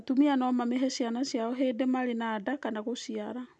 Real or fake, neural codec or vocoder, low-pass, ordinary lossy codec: real; none; none; none